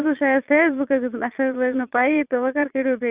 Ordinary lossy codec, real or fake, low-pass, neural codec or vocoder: Opus, 64 kbps; fake; 3.6 kHz; vocoder, 22.05 kHz, 80 mel bands, Vocos